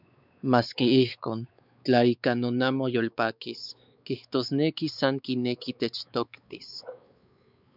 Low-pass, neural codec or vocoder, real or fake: 5.4 kHz; codec, 16 kHz, 4 kbps, X-Codec, WavLM features, trained on Multilingual LibriSpeech; fake